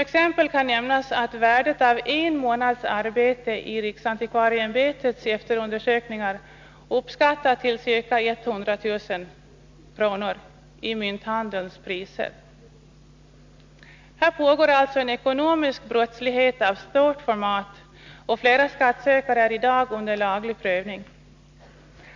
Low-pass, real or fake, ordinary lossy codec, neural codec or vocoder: 7.2 kHz; real; MP3, 64 kbps; none